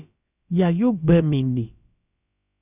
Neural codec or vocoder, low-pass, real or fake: codec, 16 kHz, about 1 kbps, DyCAST, with the encoder's durations; 3.6 kHz; fake